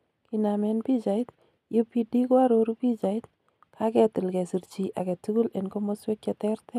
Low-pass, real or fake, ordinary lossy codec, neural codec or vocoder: 14.4 kHz; real; none; none